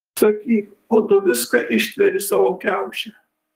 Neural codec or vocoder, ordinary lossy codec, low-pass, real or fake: codec, 32 kHz, 1.9 kbps, SNAC; Opus, 24 kbps; 14.4 kHz; fake